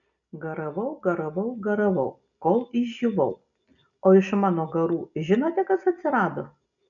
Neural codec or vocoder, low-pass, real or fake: none; 7.2 kHz; real